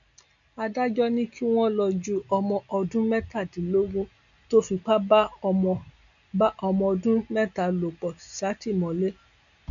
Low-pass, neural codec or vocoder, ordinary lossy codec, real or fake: 7.2 kHz; none; none; real